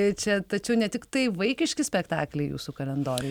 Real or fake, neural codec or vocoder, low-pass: real; none; 19.8 kHz